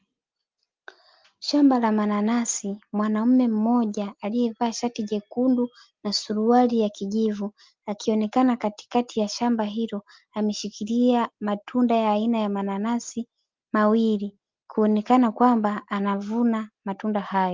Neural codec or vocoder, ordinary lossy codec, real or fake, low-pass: none; Opus, 24 kbps; real; 7.2 kHz